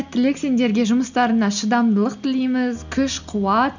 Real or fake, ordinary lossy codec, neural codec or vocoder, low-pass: real; none; none; 7.2 kHz